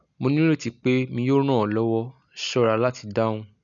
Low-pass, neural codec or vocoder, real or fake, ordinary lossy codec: 7.2 kHz; none; real; none